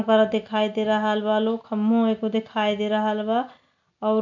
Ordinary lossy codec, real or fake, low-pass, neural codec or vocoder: none; real; 7.2 kHz; none